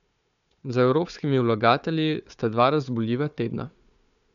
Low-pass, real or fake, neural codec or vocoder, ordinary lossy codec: 7.2 kHz; fake; codec, 16 kHz, 4 kbps, FunCodec, trained on Chinese and English, 50 frames a second; none